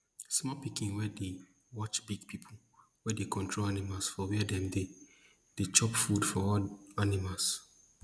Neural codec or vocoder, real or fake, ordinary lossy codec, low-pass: none; real; none; none